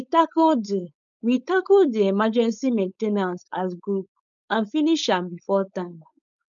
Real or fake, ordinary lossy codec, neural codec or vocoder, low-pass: fake; none; codec, 16 kHz, 4.8 kbps, FACodec; 7.2 kHz